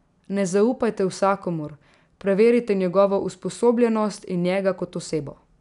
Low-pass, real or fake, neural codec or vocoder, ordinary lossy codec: 10.8 kHz; real; none; none